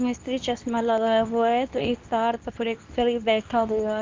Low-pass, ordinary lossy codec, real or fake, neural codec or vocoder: 7.2 kHz; Opus, 24 kbps; fake; codec, 24 kHz, 0.9 kbps, WavTokenizer, medium speech release version 2